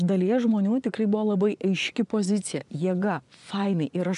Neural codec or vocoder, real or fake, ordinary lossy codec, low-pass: vocoder, 24 kHz, 100 mel bands, Vocos; fake; MP3, 96 kbps; 10.8 kHz